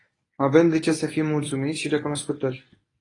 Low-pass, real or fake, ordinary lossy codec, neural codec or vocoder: 10.8 kHz; fake; AAC, 32 kbps; codec, 24 kHz, 0.9 kbps, WavTokenizer, medium speech release version 1